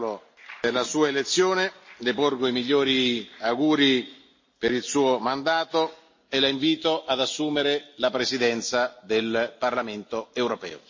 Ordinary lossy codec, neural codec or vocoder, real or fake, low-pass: MP3, 32 kbps; none; real; 7.2 kHz